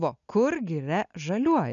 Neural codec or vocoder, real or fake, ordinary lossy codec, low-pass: none; real; MP3, 96 kbps; 7.2 kHz